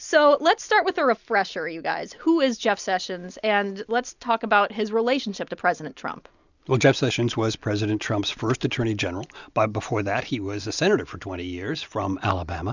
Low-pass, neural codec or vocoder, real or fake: 7.2 kHz; none; real